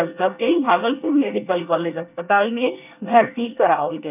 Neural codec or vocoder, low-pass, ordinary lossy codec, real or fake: codec, 24 kHz, 1 kbps, SNAC; 3.6 kHz; none; fake